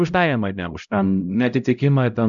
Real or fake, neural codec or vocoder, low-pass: fake; codec, 16 kHz, 0.5 kbps, X-Codec, HuBERT features, trained on LibriSpeech; 7.2 kHz